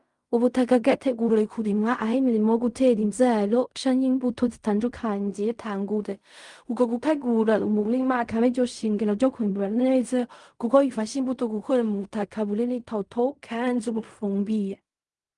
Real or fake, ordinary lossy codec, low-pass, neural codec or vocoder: fake; Opus, 32 kbps; 10.8 kHz; codec, 16 kHz in and 24 kHz out, 0.4 kbps, LongCat-Audio-Codec, fine tuned four codebook decoder